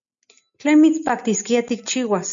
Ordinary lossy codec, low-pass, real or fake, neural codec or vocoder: MP3, 64 kbps; 7.2 kHz; real; none